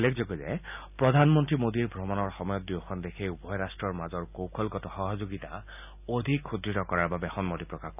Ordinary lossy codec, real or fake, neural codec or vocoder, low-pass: none; real; none; 3.6 kHz